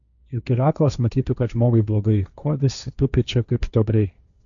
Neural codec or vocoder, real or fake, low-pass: codec, 16 kHz, 1.1 kbps, Voila-Tokenizer; fake; 7.2 kHz